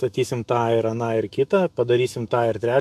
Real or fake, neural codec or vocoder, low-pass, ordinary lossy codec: fake; autoencoder, 48 kHz, 128 numbers a frame, DAC-VAE, trained on Japanese speech; 14.4 kHz; MP3, 96 kbps